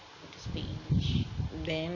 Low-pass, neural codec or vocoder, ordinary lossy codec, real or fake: 7.2 kHz; none; none; real